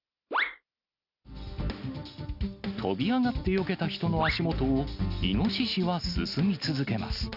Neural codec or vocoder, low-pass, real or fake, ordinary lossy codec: none; 5.4 kHz; real; none